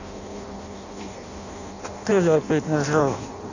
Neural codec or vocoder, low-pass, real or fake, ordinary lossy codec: codec, 16 kHz in and 24 kHz out, 0.6 kbps, FireRedTTS-2 codec; 7.2 kHz; fake; none